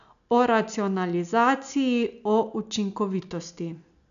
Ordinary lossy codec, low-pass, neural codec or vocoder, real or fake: none; 7.2 kHz; none; real